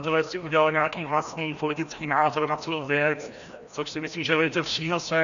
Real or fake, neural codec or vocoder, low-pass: fake; codec, 16 kHz, 1 kbps, FreqCodec, larger model; 7.2 kHz